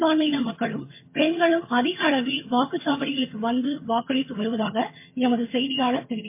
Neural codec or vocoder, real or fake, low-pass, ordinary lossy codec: vocoder, 22.05 kHz, 80 mel bands, HiFi-GAN; fake; 3.6 kHz; MP3, 24 kbps